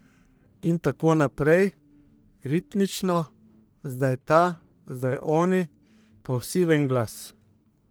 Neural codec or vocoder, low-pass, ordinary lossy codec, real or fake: codec, 44.1 kHz, 1.7 kbps, Pupu-Codec; none; none; fake